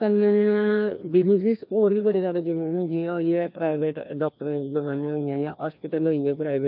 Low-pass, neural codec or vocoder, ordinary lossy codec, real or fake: 5.4 kHz; codec, 16 kHz, 1 kbps, FreqCodec, larger model; MP3, 48 kbps; fake